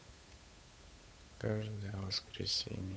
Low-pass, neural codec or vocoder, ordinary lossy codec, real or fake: none; codec, 16 kHz, 8 kbps, FunCodec, trained on Chinese and English, 25 frames a second; none; fake